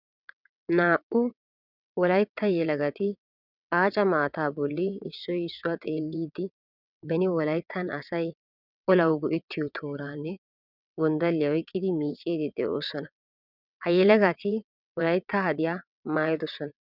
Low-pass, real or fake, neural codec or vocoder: 5.4 kHz; fake; vocoder, 22.05 kHz, 80 mel bands, WaveNeXt